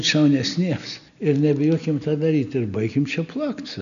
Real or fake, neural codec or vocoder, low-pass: real; none; 7.2 kHz